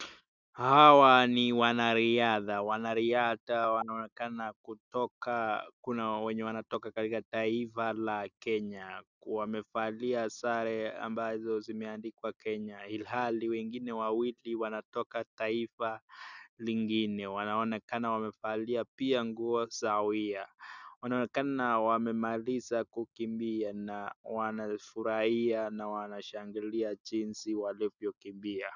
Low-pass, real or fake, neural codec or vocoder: 7.2 kHz; real; none